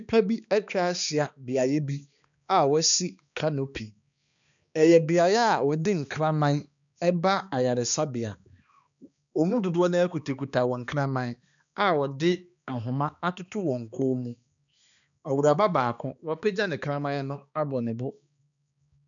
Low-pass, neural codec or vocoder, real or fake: 7.2 kHz; codec, 16 kHz, 2 kbps, X-Codec, HuBERT features, trained on balanced general audio; fake